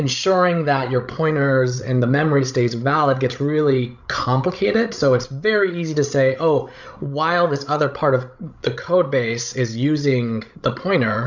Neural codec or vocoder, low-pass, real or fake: codec, 16 kHz, 16 kbps, FreqCodec, larger model; 7.2 kHz; fake